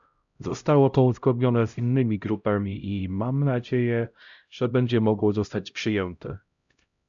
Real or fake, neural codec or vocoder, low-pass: fake; codec, 16 kHz, 0.5 kbps, X-Codec, HuBERT features, trained on LibriSpeech; 7.2 kHz